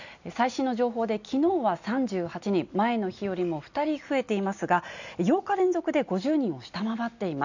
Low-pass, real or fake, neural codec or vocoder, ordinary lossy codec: 7.2 kHz; real; none; none